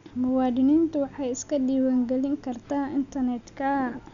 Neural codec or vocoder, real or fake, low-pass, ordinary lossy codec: none; real; 7.2 kHz; none